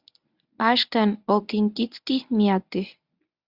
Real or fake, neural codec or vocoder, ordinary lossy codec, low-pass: fake; codec, 24 kHz, 0.9 kbps, WavTokenizer, medium speech release version 1; Opus, 64 kbps; 5.4 kHz